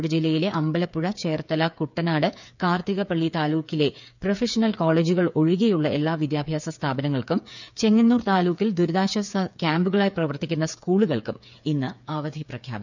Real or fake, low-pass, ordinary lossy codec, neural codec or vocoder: fake; 7.2 kHz; none; codec, 16 kHz, 8 kbps, FreqCodec, smaller model